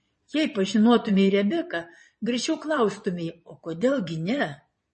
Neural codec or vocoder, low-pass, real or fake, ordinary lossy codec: vocoder, 22.05 kHz, 80 mel bands, Vocos; 9.9 kHz; fake; MP3, 32 kbps